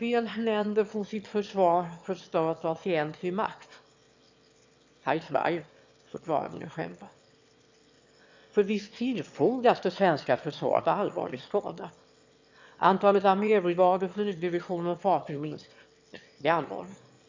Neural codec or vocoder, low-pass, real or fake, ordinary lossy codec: autoencoder, 22.05 kHz, a latent of 192 numbers a frame, VITS, trained on one speaker; 7.2 kHz; fake; MP3, 64 kbps